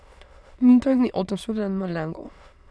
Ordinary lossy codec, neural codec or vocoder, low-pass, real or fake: none; autoencoder, 22.05 kHz, a latent of 192 numbers a frame, VITS, trained on many speakers; none; fake